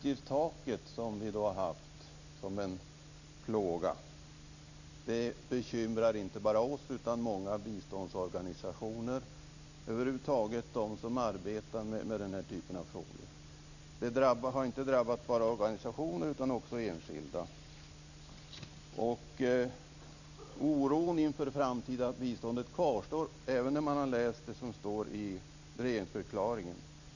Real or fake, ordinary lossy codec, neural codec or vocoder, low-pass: real; none; none; 7.2 kHz